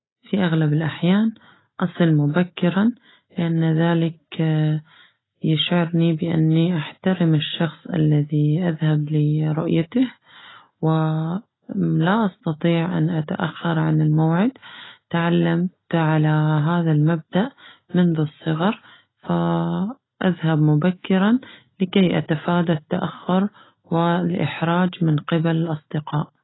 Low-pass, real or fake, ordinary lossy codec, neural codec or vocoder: 7.2 kHz; real; AAC, 16 kbps; none